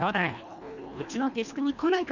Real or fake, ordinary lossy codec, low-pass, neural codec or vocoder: fake; none; 7.2 kHz; codec, 24 kHz, 1.5 kbps, HILCodec